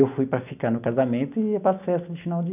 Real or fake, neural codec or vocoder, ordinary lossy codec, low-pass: real; none; none; 3.6 kHz